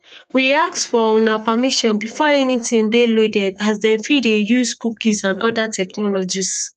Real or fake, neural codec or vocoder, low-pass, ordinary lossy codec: fake; codec, 32 kHz, 1.9 kbps, SNAC; 14.4 kHz; none